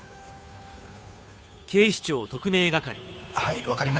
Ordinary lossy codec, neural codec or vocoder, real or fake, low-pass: none; codec, 16 kHz, 2 kbps, FunCodec, trained on Chinese and English, 25 frames a second; fake; none